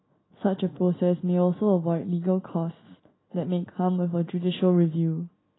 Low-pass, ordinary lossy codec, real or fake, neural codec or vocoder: 7.2 kHz; AAC, 16 kbps; real; none